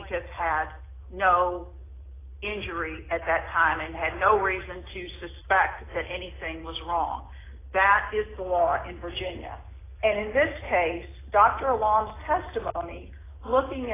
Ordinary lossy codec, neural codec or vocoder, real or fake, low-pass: AAC, 16 kbps; none; real; 3.6 kHz